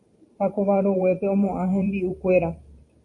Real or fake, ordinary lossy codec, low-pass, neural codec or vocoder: fake; AAC, 48 kbps; 10.8 kHz; vocoder, 44.1 kHz, 128 mel bands every 512 samples, BigVGAN v2